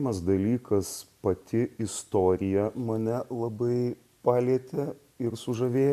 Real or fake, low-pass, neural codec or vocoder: real; 14.4 kHz; none